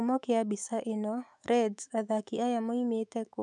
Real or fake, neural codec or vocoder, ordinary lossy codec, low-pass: fake; autoencoder, 48 kHz, 128 numbers a frame, DAC-VAE, trained on Japanese speech; none; 10.8 kHz